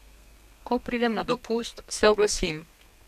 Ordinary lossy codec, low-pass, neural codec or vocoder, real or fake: none; 14.4 kHz; codec, 32 kHz, 1.9 kbps, SNAC; fake